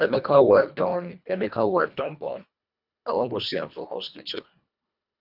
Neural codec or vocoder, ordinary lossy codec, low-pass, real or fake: codec, 24 kHz, 1.5 kbps, HILCodec; none; 5.4 kHz; fake